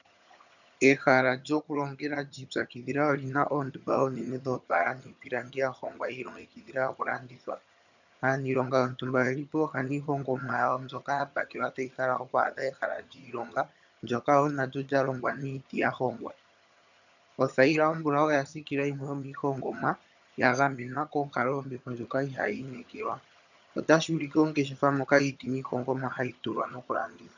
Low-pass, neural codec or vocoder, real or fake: 7.2 kHz; vocoder, 22.05 kHz, 80 mel bands, HiFi-GAN; fake